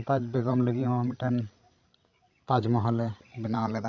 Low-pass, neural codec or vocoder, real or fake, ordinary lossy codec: 7.2 kHz; codec, 16 kHz, 16 kbps, FreqCodec, larger model; fake; none